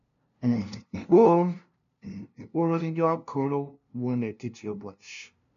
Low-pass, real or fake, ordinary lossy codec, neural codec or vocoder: 7.2 kHz; fake; none; codec, 16 kHz, 0.5 kbps, FunCodec, trained on LibriTTS, 25 frames a second